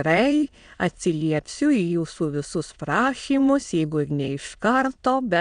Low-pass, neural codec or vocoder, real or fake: 9.9 kHz; autoencoder, 22.05 kHz, a latent of 192 numbers a frame, VITS, trained on many speakers; fake